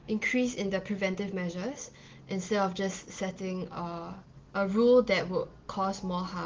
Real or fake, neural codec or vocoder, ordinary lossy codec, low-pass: real; none; Opus, 16 kbps; 7.2 kHz